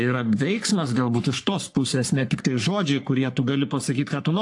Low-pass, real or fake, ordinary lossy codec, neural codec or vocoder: 10.8 kHz; fake; AAC, 64 kbps; codec, 44.1 kHz, 3.4 kbps, Pupu-Codec